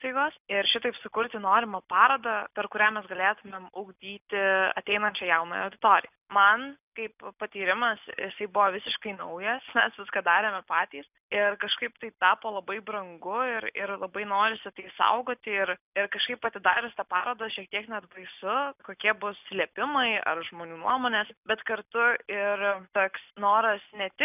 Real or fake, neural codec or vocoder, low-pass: real; none; 3.6 kHz